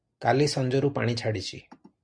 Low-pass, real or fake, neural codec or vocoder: 9.9 kHz; real; none